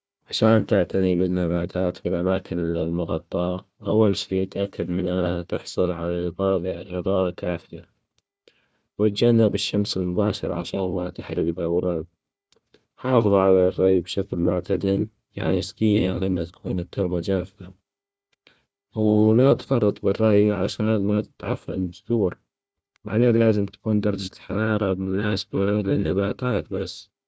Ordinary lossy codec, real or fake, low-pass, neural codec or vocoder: none; fake; none; codec, 16 kHz, 1 kbps, FunCodec, trained on Chinese and English, 50 frames a second